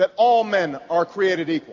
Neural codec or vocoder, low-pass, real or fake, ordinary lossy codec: none; 7.2 kHz; real; AAC, 48 kbps